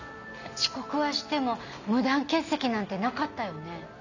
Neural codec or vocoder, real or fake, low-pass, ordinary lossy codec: none; real; 7.2 kHz; none